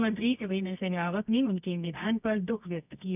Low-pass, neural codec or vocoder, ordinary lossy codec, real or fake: 3.6 kHz; codec, 24 kHz, 0.9 kbps, WavTokenizer, medium music audio release; none; fake